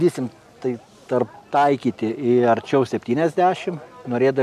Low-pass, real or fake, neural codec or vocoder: 14.4 kHz; real; none